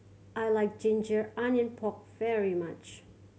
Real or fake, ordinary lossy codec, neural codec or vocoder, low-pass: real; none; none; none